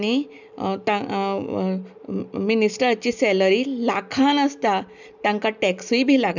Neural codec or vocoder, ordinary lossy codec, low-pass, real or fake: none; none; 7.2 kHz; real